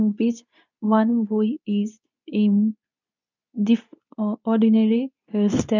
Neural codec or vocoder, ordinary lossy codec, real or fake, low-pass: codec, 24 kHz, 0.9 kbps, WavTokenizer, medium speech release version 2; none; fake; 7.2 kHz